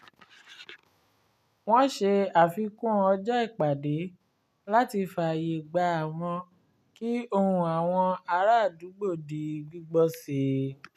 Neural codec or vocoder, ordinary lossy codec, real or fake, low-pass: none; none; real; 14.4 kHz